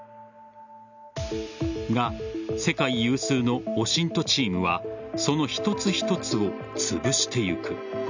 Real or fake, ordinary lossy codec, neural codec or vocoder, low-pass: real; none; none; 7.2 kHz